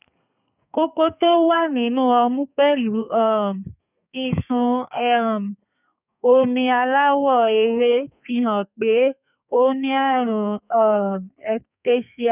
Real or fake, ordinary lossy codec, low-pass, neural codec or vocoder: fake; none; 3.6 kHz; codec, 32 kHz, 1.9 kbps, SNAC